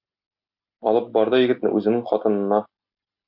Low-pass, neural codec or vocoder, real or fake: 5.4 kHz; none; real